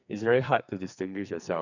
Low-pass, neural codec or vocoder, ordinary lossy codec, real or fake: 7.2 kHz; codec, 44.1 kHz, 2.6 kbps, SNAC; none; fake